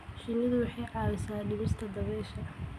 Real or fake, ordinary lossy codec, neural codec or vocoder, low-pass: real; none; none; 14.4 kHz